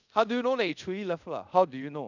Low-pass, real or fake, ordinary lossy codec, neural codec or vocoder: 7.2 kHz; fake; none; codec, 24 kHz, 0.5 kbps, DualCodec